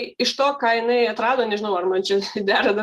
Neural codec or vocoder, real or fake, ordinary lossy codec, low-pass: none; real; Opus, 24 kbps; 14.4 kHz